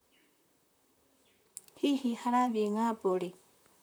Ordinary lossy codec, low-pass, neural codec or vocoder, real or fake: none; none; vocoder, 44.1 kHz, 128 mel bands, Pupu-Vocoder; fake